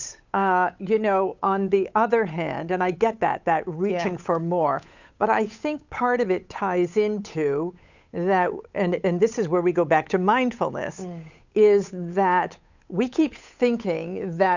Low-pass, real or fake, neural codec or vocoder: 7.2 kHz; fake; codec, 16 kHz, 8 kbps, FunCodec, trained on Chinese and English, 25 frames a second